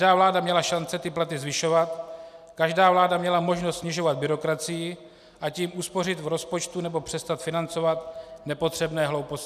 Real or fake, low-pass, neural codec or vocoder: real; 14.4 kHz; none